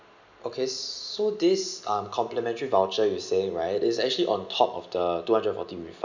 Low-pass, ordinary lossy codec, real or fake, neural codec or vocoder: 7.2 kHz; none; real; none